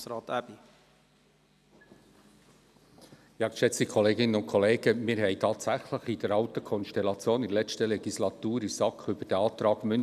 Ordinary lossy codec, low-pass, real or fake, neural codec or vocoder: none; 14.4 kHz; real; none